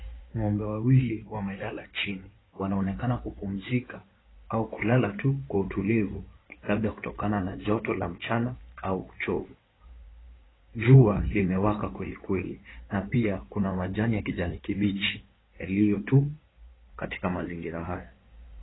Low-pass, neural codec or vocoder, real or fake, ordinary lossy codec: 7.2 kHz; codec, 16 kHz in and 24 kHz out, 2.2 kbps, FireRedTTS-2 codec; fake; AAC, 16 kbps